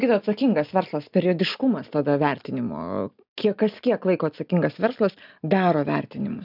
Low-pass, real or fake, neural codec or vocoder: 5.4 kHz; real; none